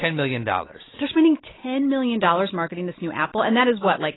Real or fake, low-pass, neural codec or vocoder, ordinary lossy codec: real; 7.2 kHz; none; AAC, 16 kbps